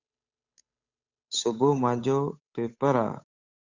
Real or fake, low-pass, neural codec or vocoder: fake; 7.2 kHz; codec, 16 kHz, 8 kbps, FunCodec, trained on Chinese and English, 25 frames a second